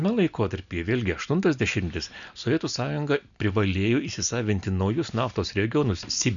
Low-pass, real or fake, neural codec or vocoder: 7.2 kHz; real; none